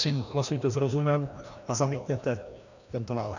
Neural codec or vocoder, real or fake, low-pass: codec, 16 kHz, 1 kbps, FreqCodec, larger model; fake; 7.2 kHz